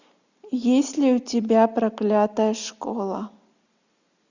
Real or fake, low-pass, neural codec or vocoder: real; 7.2 kHz; none